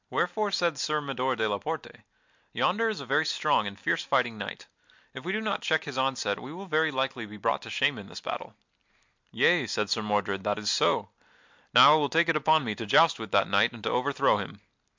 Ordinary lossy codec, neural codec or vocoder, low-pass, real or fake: MP3, 64 kbps; none; 7.2 kHz; real